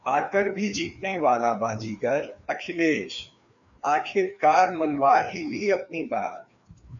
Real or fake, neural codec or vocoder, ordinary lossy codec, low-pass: fake; codec, 16 kHz, 2 kbps, FreqCodec, larger model; MP3, 96 kbps; 7.2 kHz